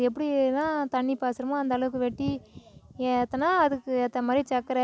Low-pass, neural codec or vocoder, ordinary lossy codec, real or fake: none; none; none; real